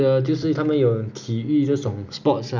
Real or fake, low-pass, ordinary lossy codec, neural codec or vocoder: real; 7.2 kHz; none; none